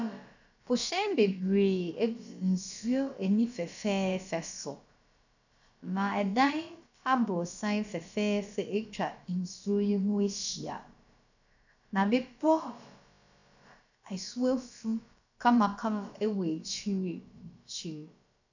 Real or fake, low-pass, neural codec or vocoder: fake; 7.2 kHz; codec, 16 kHz, about 1 kbps, DyCAST, with the encoder's durations